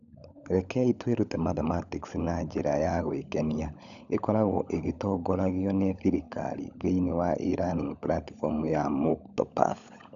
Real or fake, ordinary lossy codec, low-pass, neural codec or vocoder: fake; none; 7.2 kHz; codec, 16 kHz, 16 kbps, FunCodec, trained on LibriTTS, 50 frames a second